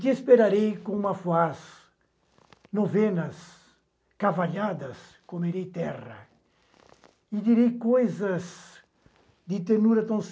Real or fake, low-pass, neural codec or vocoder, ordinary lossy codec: real; none; none; none